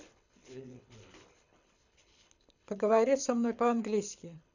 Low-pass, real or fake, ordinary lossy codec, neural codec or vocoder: 7.2 kHz; fake; none; codec, 24 kHz, 6 kbps, HILCodec